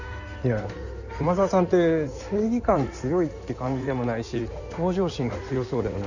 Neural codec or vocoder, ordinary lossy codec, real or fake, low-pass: codec, 16 kHz in and 24 kHz out, 2.2 kbps, FireRedTTS-2 codec; none; fake; 7.2 kHz